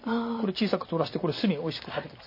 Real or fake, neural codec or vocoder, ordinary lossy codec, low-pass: fake; vocoder, 44.1 kHz, 128 mel bands every 256 samples, BigVGAN v2; MP3, 24 kbps; 5.4 kHz